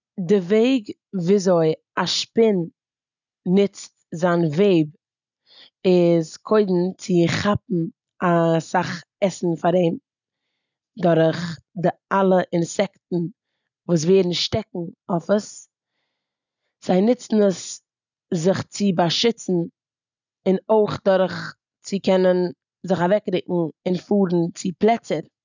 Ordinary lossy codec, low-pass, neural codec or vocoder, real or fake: none; 7.2 kHz; none; real